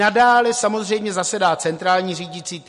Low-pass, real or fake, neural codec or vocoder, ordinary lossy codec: 14.4 kHz; real; none; MP3, 48 kbps